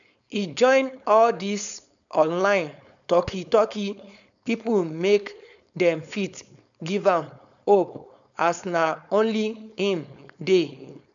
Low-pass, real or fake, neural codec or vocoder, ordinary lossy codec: 7.2 kHz; fake; codec, 16 kHz, 4.8 kbps, FACodec; none